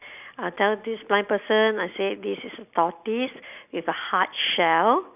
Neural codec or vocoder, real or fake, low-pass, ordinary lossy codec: none; real; 3.6 kHz; none